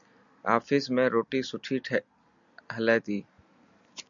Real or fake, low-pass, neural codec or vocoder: real; 7.2 kHz; none